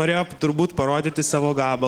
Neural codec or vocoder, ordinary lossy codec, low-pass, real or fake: none; Opus, 16 kbps; 19.8 kHz; real